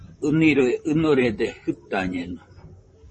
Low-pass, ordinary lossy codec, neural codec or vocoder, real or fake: 10.8 kHz; MP3, 32 kbps; vocoder, 44.1 kHz, 128 mel bands, Pupu-Vocoder; fake